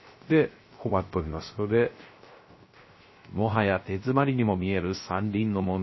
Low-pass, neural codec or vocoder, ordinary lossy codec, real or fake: 7.2 kHz; codec, 16 kHz, 0.3 kbps, FocalCodec; MP3, 24 kbps; fake